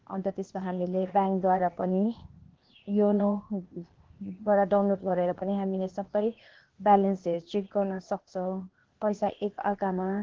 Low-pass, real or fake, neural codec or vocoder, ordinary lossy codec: 7.2 kHz; fake; codec, 16 kHz, 0.8 kbps, ZipCodec; Opus, 16 kbps